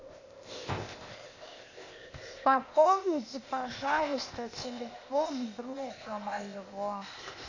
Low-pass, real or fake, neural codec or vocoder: 7.2 kHz; fake; codec, 16 kHz, 0.8 kbps, ZipCodec